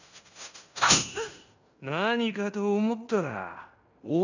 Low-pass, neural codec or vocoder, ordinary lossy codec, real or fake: 7.2 kHz; codec, 16 kHz in and 24 kHz out, 0.9 kbps, LongCat-Audio-Codec, fine tuned four codebook decoder; none; fake